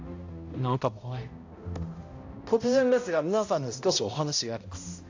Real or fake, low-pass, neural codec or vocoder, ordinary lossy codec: fake; 7.2 kHz; codec, 16 kHz, 0.5 kbps, X-Codec, HuBERT features, trained on balanced general audio; AAC, 48 kbps